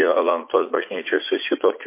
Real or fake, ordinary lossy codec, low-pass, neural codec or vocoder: fake; MP3, 24 kbps; 3.6 kHz; vocoder, 44.1 kHz, 80 mel bands, Vocos